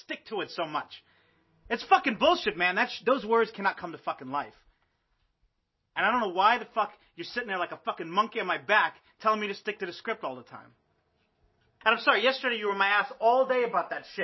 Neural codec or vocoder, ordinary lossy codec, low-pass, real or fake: none; MP3, 24 kbps; 7.2 kHz; real